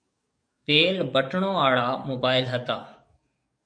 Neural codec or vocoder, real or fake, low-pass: codec, 44.1 kHz, 7.8 kbps, DAC; fake; 9.9 kHz